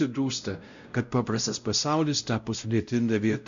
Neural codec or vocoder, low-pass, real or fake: codec, 16 kHz, 0.5 kbps, X-Codec, WavLM features, trained on Multilingual LibriSpeech; 7.2 kHz; fake